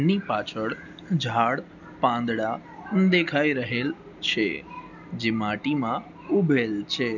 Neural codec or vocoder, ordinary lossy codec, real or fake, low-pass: none; none; real; 7.2 kHz